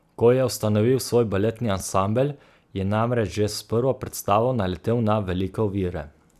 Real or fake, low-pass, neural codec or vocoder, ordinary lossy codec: real; 14.4 kHz; none; none